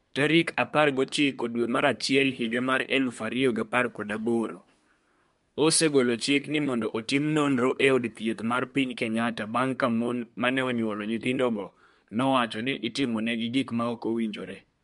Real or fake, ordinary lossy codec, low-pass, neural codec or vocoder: fake; MP3, 64 kbps; 10.8 kHz; codec, 24 kHz, 1 kbps, SNAC